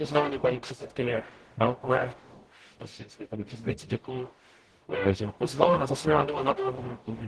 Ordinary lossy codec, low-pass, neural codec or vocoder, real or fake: Opus, 16 kbps; 10.8 kHz; codec, 44.1 kHz, 0.9 kbps, DAC; fake